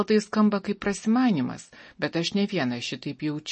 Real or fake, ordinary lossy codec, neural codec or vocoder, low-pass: real; MP3, 32 kbps; none; 10.8 kHz